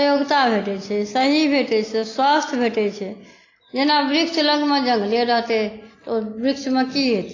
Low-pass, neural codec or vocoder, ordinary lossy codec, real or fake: 7.2 kHz; none; AAC, 32 kbps; real